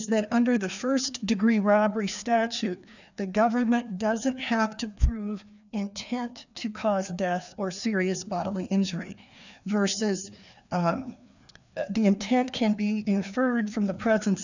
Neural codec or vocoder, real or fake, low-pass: codec, 16 kHz, 2 kbps, FreqCodec, larger model; fake; 7.2 kHz